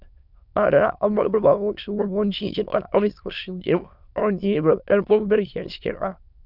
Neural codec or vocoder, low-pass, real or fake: autoencoder, 22.05 kHz, a latent of 192 numbers a frame, VITS, trained on many speakers; 5.4 kHz; fake